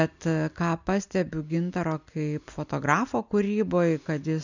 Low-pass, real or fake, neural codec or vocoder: 7.2 kHz; real; none